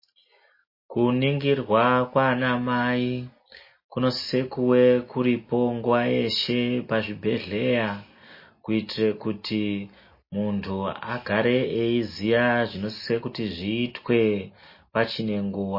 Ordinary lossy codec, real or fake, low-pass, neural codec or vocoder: MP3, 24 kbps; real; 5.4 kHz; none